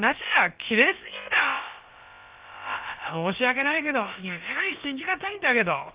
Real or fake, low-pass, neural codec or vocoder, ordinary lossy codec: fake; 3.6 kHz; codec, 16 kHz, about 1 kbps, DyCAST, with the encoder's durations; Opus, 32 kbps